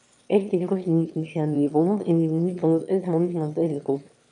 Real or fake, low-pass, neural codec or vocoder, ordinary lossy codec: fake; 9.9 kHz; autoencoder, 22.05 kHz, a latent of 192 numbers a frame, VITS, trained on one speaker; MP3, 64 kbps